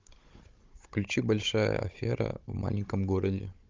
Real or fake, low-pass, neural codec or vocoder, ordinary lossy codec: fake; 7.2 kHz; codec, 16 kHz, 16 kbps, FunCodec, trained on Chinese and English, 50 frames a second; Opus, 24 kbps